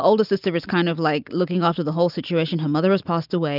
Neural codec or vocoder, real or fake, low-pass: none; real; 5.4 kHz